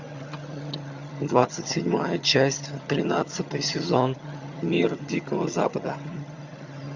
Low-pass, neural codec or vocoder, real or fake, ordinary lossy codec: 7.2 kHz; vocoder, 22.05 kHz, 80 mel bands, HiFi-GAN; fake; Opus, 64 kbps